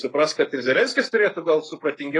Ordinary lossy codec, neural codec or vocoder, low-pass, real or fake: AAC, 32 kbps; codec, 44.1 kHz, 7.8 kbps, Pupu-Codec; 10.8 kHz; fake